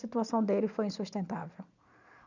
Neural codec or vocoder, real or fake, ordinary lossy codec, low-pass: none; real; none; 7.2 kHz